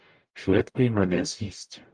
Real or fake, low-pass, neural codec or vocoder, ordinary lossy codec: fake; 9.9 kHz; codec, 44.1 kHz, 0.9 kbps, DAC; Opus, 32 kbps